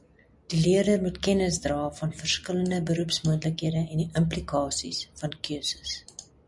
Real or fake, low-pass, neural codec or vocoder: real; 10.8 kHz; none